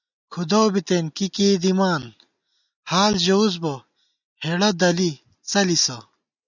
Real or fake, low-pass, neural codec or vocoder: real; 7.2 kHz; none